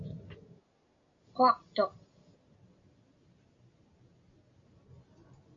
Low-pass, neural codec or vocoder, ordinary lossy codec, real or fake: 7.2 kHz; none; AAC, 48 kbps; real